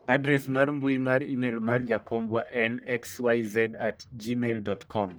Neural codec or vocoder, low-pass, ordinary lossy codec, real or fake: codec, 44.1 kHz, 1.7 kbps, Pupu-Codec; none; none; fake